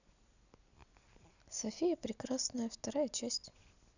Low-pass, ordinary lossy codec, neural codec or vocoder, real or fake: 7.2 kHz; none; none; real